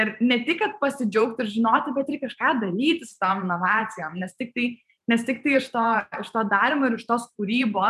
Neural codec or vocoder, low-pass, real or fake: vocoder, 44.1 kHz, 128 mel bands every 512 samples, BigVGAN v2; 14.4 kHz; fake